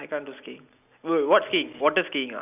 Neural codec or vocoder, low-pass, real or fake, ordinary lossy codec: none; 3.6 kHz; real; none